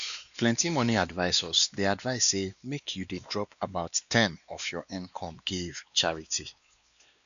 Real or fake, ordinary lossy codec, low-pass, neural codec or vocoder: fake; none; 7.2 kHz; codec, 16 kHz, 2 kbps, X-Codec, WavLM features, trained on Multilingual LibriSpeech